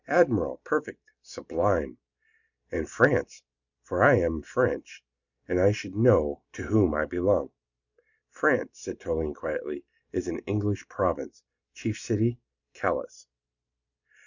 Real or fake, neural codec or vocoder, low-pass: real; none; 7.2 kHz